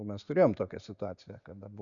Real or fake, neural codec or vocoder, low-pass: real; none; 7.2 kHz